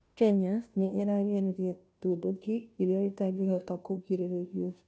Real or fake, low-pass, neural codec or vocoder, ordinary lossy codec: fake; none; codec, 16 kHz, 0.5 kbps, FunCodec, trained on Chinese and English, 25 frames a second; none